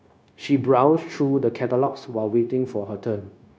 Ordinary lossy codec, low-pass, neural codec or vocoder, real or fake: none; none; codec, 16 kHz, 0.9 kbps, LongCat-Audio-Codec; fake